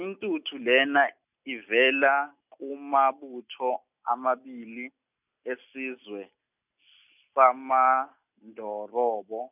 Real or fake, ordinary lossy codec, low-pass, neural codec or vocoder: real; none; 3.6 kHz; none